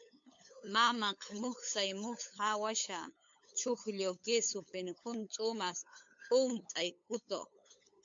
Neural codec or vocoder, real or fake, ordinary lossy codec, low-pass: codec, 16 kHz, 8 kbps, FunCodec, trained on LibriTTS, 25 frames a second; fake; MP3, 48 kbps; 7.2 kHz